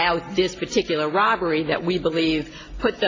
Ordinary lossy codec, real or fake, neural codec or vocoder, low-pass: AAC, 32 kbps; real; none; 7.2 kHz